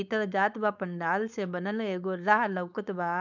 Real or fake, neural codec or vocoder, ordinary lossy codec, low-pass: fake; codec, 16 kHz, 4.8 kbps, FACodec; none; 7.2 kHz